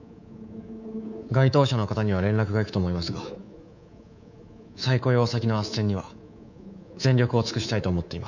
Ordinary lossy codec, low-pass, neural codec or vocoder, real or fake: none; 7.2 kHz; codec, 24 kHz, 3.1 kbps, DualCodec; fake